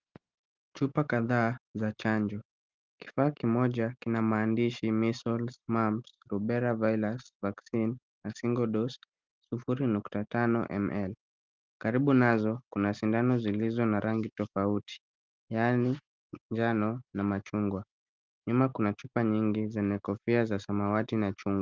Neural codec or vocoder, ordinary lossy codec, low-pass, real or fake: none; Opus, 24 kbps; 7.2 kHz; real